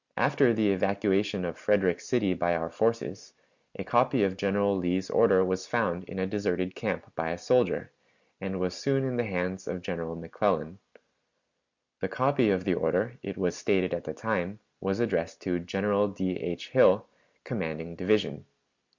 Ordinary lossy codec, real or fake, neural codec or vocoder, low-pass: Opus, 64 kbps; real; none; 7.2 kHz